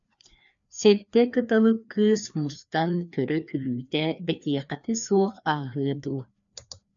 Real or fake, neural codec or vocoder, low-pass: fake; codec, 16 kHz, 2 kbps, FreqCodec, larger model; 7.2 kHz